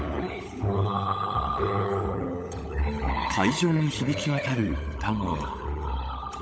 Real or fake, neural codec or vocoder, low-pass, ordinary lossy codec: fake; codec, 16 kHz, 16 kbps, FunCodec, trained on LibriTTS, 50 frames a second; none; none